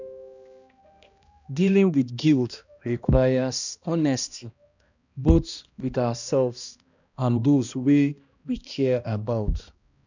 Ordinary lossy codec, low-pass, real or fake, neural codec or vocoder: none; 7.2 kHz; fake; codec, 16 kHz, 1 kbps, X-Codec, HuBERT features, trained on balanced general audio